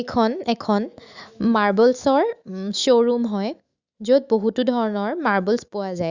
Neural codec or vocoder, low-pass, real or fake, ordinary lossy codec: autoencoder, 48 kHz, 128 numbers a frame, DAC-VAE, trained on Japanese speech; 7.2 kHz; fake; Opus, 64 kbps